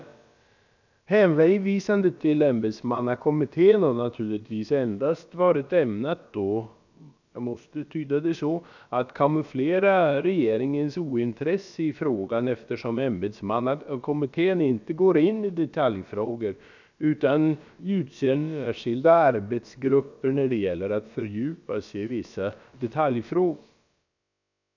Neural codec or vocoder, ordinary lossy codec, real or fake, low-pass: codec, 16 kHz, about 1 kbps, DyCAST, with the encoder's durations; none; fake; 7.2 kHz